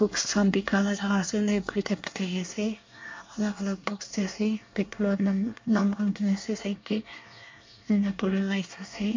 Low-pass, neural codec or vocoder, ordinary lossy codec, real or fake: 7.2 kHz; codec, 24 kHz, 1 kbps, SNAC; MP3, 48 kbps; fake